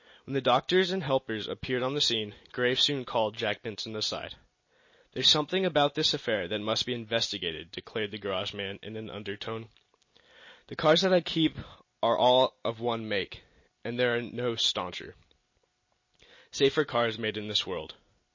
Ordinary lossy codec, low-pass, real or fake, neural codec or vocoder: MP3, 32 kbps; 7.2 kHz; real; none